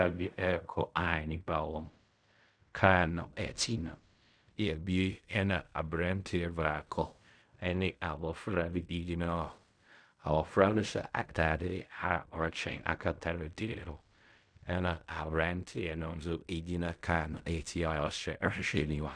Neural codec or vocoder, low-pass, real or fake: codec, 16 kHz in and 24 kHz out, 0.4 kbps, LongCat-Audio-Codec, fine tuned four codebook decoder; 9.9 kHz; fake